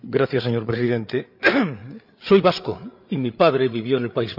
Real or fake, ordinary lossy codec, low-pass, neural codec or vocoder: fake; none; 5.4 kHz; codec, 16 kHz, 8 kbps, FreqCodec, larger model